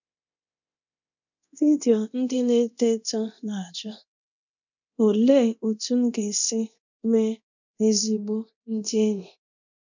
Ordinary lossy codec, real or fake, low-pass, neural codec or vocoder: none; fake; 7.2 kHz; codec, 24 kHz, 0.9 kbps, DualCodec